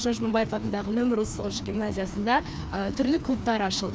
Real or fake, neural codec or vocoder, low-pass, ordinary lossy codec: fake; codec, 16 kHz, 2 kbps, FreqCodec, larger model; none; none